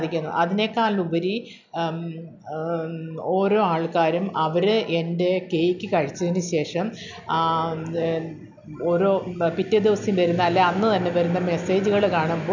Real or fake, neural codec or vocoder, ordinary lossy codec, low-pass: real; none; none; 7.2 kHz